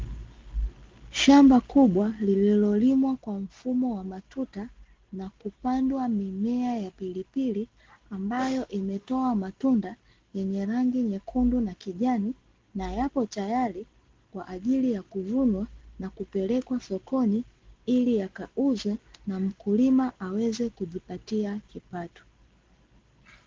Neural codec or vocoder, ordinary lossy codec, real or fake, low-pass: none; Opus, 16 kbps; real; 7.2 kHz